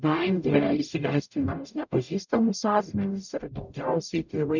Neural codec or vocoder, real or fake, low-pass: codec, 44.1 kHz, 0.9 kbps, DAC; fake; 7.2 kHz